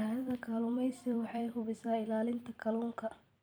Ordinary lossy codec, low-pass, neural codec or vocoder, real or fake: none; none; vocoder, 44.1 kHz, 128 mel bands every 256 samples, BigVGAN v2; fake